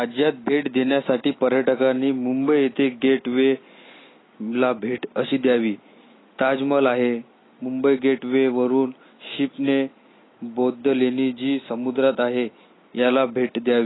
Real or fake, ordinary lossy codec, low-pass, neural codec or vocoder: real; AAC, 16 kbps; 7.2 kHz; none